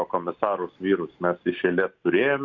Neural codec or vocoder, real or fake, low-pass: none; real; 7.2 kHz